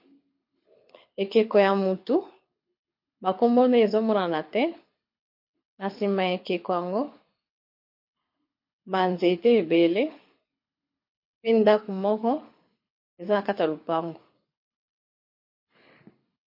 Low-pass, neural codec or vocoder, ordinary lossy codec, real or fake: 5.4 kHz; codec, 24 kHz, 6 kbps, HILCodec; MP3, 32 kbps; fake